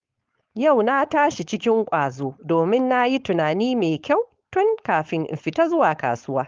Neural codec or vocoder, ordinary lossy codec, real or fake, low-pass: codec, 16 kHz, 4.8 kbps, FACodec; Opus, 32 kbps; fake; 7.2 kHz